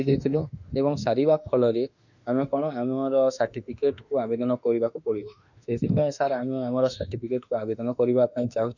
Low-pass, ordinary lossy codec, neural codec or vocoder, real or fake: 7.2 kHz; none; autoencoder, 48 kHz, 32 numbers a frame, DAC-VAE, trained on Japanese speech; fake